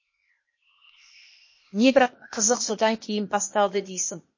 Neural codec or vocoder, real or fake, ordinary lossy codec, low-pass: codec, 16 kHz, 0.8 kbps, ZipCodec; fake; MP3, 32 kbps; 7.2 kHz